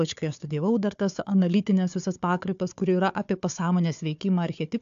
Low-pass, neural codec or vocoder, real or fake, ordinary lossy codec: 7.2 kHz; codec, 16 kHz, 4 kbps, FunCodec, trained on Chinese and English, 50 frames a second; fake; AAC, 64 kbps